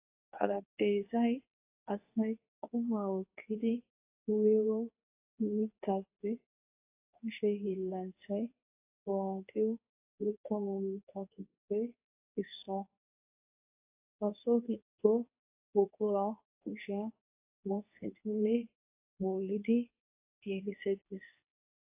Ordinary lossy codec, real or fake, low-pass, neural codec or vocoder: AAC, 32 kbps; fake; 3.6 kHz; codec, 24 kHz, 0.9 kbps, WavTokenizer, medium speech release version 2